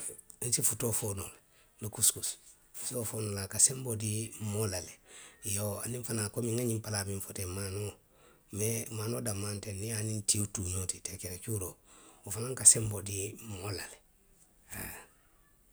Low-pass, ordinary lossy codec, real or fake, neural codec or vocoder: none; none; real; none